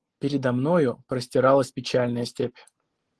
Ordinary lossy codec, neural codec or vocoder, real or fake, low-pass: Opus, 16 kbps; none; real; 10.8 kHz